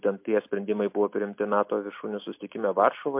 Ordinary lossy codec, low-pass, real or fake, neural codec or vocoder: MP3, 32 kbps; 3.6 kHz; real; none